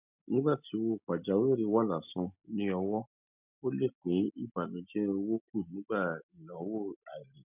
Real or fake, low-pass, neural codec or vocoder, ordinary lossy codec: real; 3.6 kHz; none; none